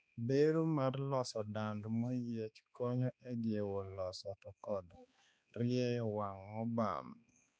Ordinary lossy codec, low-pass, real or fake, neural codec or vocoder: none; none; fake; codec, 16 kHz, 2 kbps, X-Codec, HuBERT features, trained on balanced general audio